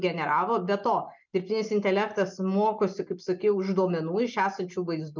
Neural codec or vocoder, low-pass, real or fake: none; 7.2 kHz; real